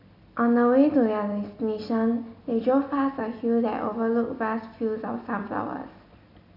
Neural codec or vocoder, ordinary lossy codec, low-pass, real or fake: none; AAC, 48 kbps; 5.4 kHz; real